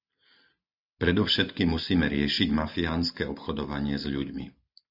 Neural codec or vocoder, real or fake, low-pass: none; real; 5.4 kHz